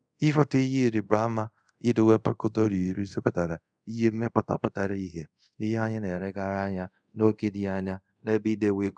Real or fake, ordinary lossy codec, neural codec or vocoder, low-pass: fake; none; codec, 24 kHz, 0.5 kbps, DualCodec; 9.9 kHz